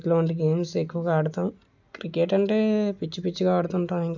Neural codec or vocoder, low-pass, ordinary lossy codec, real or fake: none; 7.2 kHz; none; real